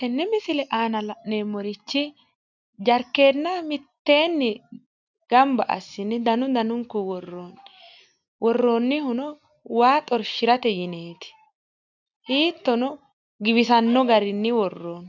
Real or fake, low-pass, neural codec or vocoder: real; 7.2 kHz; none